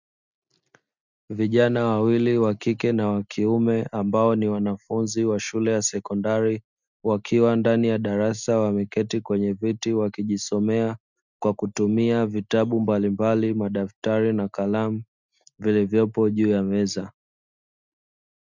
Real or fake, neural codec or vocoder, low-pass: real; none; 7.2 kHz